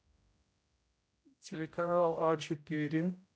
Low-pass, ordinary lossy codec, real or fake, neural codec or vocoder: none; none; fake; codec, 16 kHz, 0.5 kbps, X-Codec, HuBERT features, trained on general audio